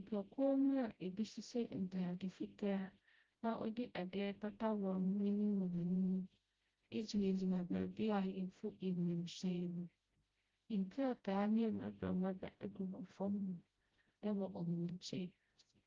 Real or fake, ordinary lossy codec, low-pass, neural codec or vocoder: fake; Opus, 32 kbps; 7.2 kHz; codec, 16 kHz, 0.5 kbps, FreqCodec, smaller model